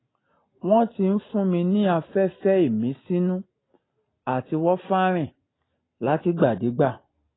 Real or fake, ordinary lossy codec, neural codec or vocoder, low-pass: real; AAC, 16 kbps; none; 7.2 kHz